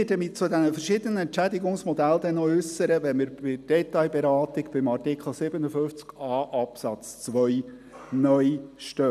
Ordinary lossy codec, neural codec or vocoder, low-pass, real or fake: none; none; 14.4 kHz; real